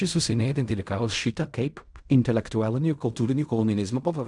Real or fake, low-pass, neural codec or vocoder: fake; 10.8 kHz; codec, 16 kHz in and 24 kHz out, 0.4 kbps, LongCat-Audio-Codec, fine tuned four codebook decoder